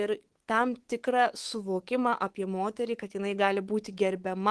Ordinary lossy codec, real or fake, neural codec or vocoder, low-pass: Opus, 16 kbps; fake; codec, 24 kHz, 3.1 kbps, DualCodec; 10.8 kHz